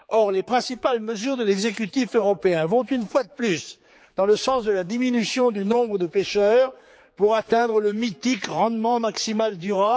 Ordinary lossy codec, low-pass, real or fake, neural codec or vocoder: none; none; fake; codec, 16 kHz, 4 kbps, X-Codec, HuBERT features, trained on general audio